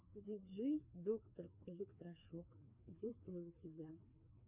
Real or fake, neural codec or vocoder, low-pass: fake; codec, 16 kHz, 2 kbps, FreqCodec, larger model; 3.6 kHz